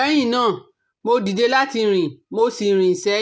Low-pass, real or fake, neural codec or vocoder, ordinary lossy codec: none; real; none; none